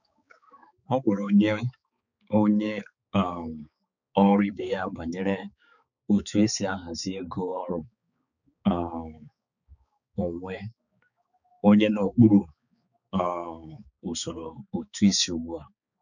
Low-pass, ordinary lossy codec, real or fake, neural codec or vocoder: 7.2 kHz; none; fake; codec, 16 kHz, 4 kbps, X-Codec, HuBERT features, trained on balanced general audio